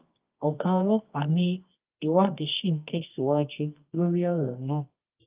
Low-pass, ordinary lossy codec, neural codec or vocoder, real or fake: 3.6 kHz; Opus, 24 kbps; codec, 24 kHz, 0.9 kbps, WavTokenizer, medium music audio release; fake